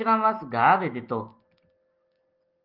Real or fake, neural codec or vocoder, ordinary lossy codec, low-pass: real; none; Opus, 32 kbps; 5.4 kHz